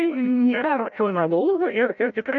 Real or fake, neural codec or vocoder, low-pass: fake; codec, 16 kHz, 0.5 kbps, FreqCodec, larger model; 7.2 kHz